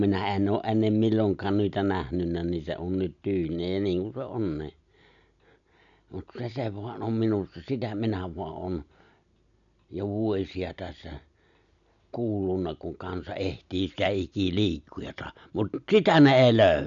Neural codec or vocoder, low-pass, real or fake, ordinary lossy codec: none; 7.2 kHz; real; none